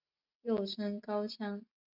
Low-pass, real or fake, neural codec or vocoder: 5.4 kHz; real; none